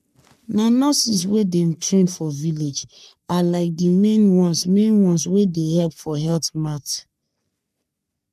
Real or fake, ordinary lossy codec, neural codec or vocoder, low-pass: fake; none; codec, 44.1 kHz, 3.4 kbps, Pupu-Codec; 14.4 kHz